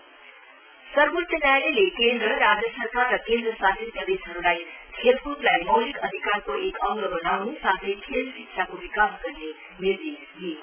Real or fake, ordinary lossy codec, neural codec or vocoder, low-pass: real; none; none; 3.6 kHz